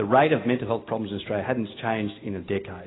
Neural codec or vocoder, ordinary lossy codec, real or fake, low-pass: none; AAC, 16 kbps; real; 7.2 kHz